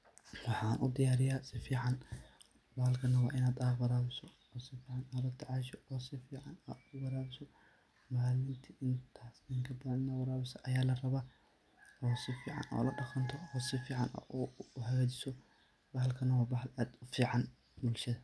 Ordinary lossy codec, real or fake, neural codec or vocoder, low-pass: none; real; none; none